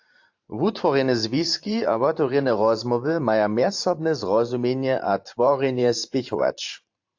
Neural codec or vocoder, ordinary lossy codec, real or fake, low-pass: vocoder, 44.1 kHz, 128 mel bands every 512 samples, BigVGAN v2; AAC, 48 kbps; fake; 7.2 kHz